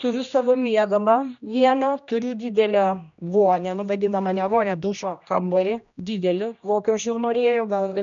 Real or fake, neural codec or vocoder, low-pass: fake; codec, 16 kHz, 1 kbps, X-Codec, HuBERT features, trained on general audio; 7.2 kHz